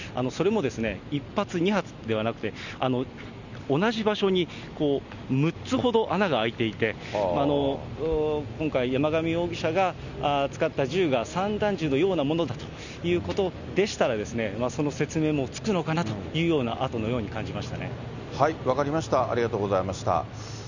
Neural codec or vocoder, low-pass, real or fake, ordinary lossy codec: none; 7.2 kHz; real; none